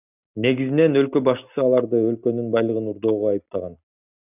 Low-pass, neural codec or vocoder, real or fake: 3.6 kHz; none; real